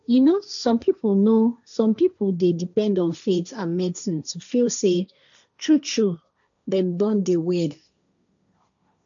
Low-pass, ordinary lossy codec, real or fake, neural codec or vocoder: 7.2 kHz; none; fake; codec, 16 kHz, 1.1 kbps, Voila-Tokenizer